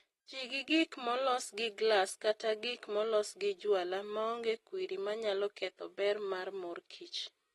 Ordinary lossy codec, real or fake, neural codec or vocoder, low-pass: AAC, 32 kbps; real; none; 19.8 kHz